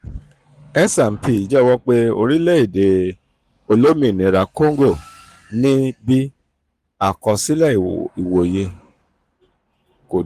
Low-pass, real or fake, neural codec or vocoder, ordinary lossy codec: 14.4 kHz; fake; codec, 44.1 kHz, 7.8 kbps, Pupu-Codec; Opus, 32 kbps